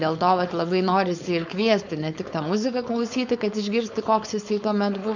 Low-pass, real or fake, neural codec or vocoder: 7.2 kHz; fake; codec, 16 kHz, 4.8 kbps, FACodec